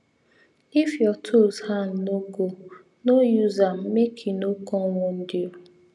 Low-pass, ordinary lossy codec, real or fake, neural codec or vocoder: none; none; real; none